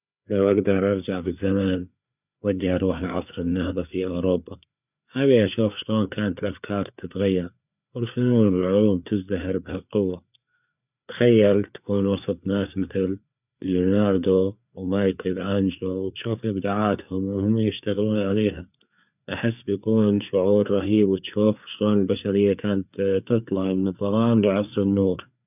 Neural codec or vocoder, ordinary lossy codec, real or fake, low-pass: codec, 16 kHz, 4 kbps, FreqCodec, larger model; none; fake; 3.6 kHz